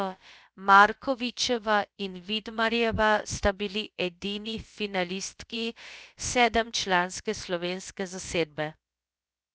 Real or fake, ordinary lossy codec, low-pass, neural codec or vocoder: fake; none; none; codec, 16 kHz, about 1 kbps, DyCAST, with the encoder's durations